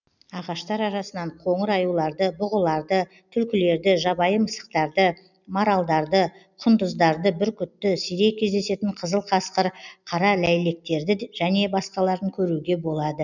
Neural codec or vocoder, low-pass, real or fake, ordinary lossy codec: none; 7.2 kHz; real; none